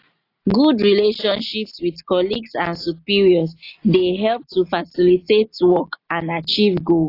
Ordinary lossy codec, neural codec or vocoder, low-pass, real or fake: AAC, 32 kbps; none; 5.4 kHz; real